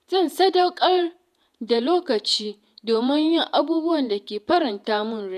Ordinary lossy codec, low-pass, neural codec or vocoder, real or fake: none; 14.4 kHz; vocoder, 48 kHz, 128 mel bands, Vocos; fake